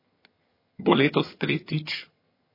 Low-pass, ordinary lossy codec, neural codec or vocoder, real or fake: 5.4 kHz; MP3, 24 kbps; vocoder, 22.05 kHz, 80 mel bands, HiFi-GAN; fake